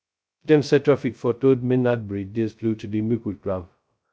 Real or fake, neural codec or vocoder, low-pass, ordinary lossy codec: fake; codec, 16 kHz, 0.2 kbps, FocalCodec; none; none